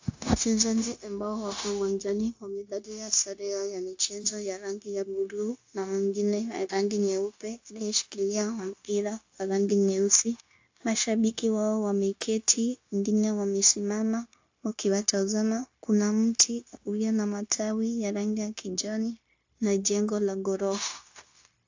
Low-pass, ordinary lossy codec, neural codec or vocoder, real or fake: 7.2 kHz; AAC, 48 kbps; codec, 16 kHz, 0.9 kbps, LongCat-Audio-Codec; fake